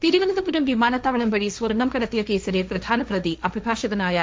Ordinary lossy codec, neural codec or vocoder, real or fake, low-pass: none; codec, 16 kHz, 1.1 kbps, Voila-Tokenizer; fake; none